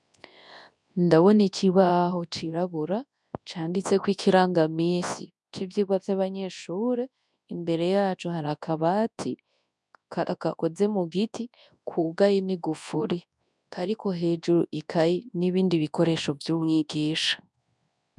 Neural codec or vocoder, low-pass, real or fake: codec, 24 kHz, 0.9 kbps, WavTokenizer, large speech release; 10.8 kHz; fake